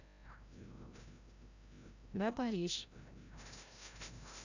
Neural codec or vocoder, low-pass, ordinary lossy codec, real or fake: codec, 16 kHz, 0.5 kbps, FreqCodec, larger model; 7.2 kHz; none; fake